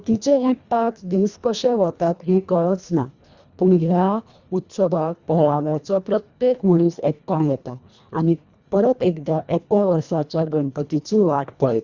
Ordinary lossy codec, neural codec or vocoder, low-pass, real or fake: Opus, 64 kbps; codec, 24 kHz, 1.5 kbps, HILCodec; 7.2 kHz; fake